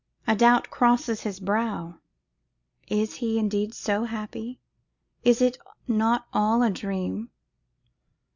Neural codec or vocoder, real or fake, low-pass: none; real; 7.2 kHz